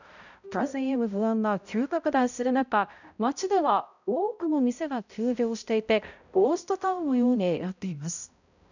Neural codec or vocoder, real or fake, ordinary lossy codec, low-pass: codec, 16 kHz, 0.5 kbps, X-Codec, HuBERT features, trained on balanced general audio; fake; none; 7.2 kHz